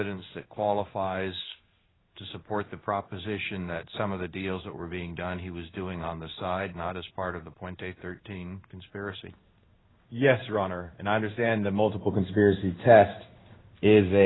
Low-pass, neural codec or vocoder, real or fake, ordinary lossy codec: 7.2 kHz; codec, 16 kHz in and 24 kHz out, 1 kbps, XY-Tokenizer; fake; AAC, 16 kbps